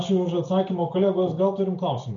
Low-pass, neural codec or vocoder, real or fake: 7.2 kHz; none; real